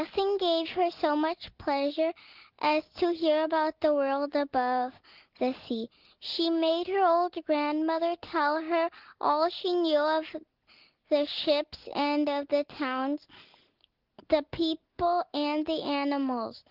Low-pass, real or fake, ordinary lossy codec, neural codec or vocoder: 5.4 kHz; real; Opus, 16 kbps; none